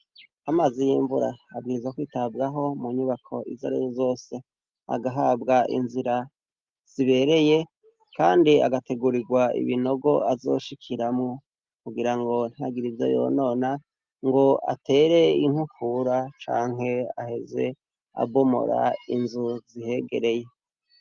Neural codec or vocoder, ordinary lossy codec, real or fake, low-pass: none; Opus, 32 kbps; real; 7.2 kHz